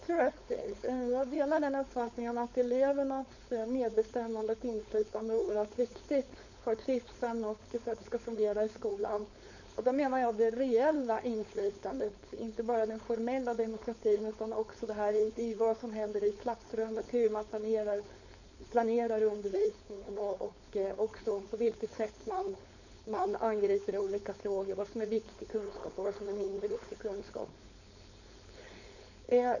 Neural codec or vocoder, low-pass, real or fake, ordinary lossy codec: codec, 16 kHz, 4.8 kbps, FACodec; 7.2 kHz; fake; none